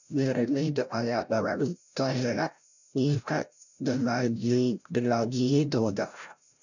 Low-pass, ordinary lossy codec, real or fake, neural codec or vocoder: 7.2 kHz; none; fake; codec, 16 kHz, 0.5 kbps, FreqCodec, larger model